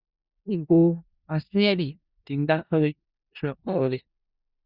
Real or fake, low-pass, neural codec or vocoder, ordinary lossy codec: fake; 5.4 kHz; codec, 16 kHz in and 24 kHz out, 0.4 kbps, LongCat-Audio-Codec, four codebook decoder; Opus, 64 kbps